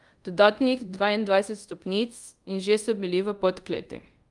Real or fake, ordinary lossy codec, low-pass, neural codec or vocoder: fake; Opus, 32 kbps; 10.8 kHz; codec, 24 kHz, 0.5 kbps, DualCodec